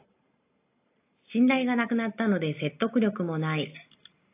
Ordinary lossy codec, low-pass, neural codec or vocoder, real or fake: AAC, 24 kbps; 3.6 kHz; none; real